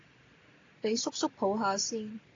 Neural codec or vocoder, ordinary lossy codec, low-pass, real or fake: none; AAC, 32 kbps; 7.2 kHz; real